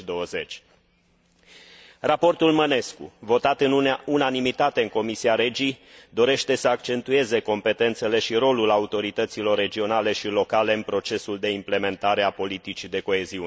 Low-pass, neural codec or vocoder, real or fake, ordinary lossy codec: none; none; real; none